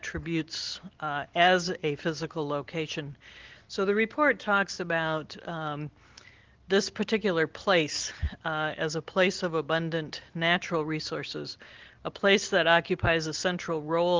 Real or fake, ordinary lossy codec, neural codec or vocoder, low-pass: real; Opus, 16 kbps; none; 7.2 kHz